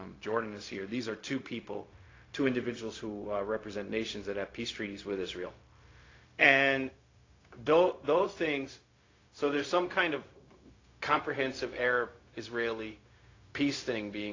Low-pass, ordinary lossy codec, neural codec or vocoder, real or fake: 7.2 kHz; AAC, 32 kbps; codec, 16 kHz, 0.4 kbps, LongCat-Audio-Codec; fake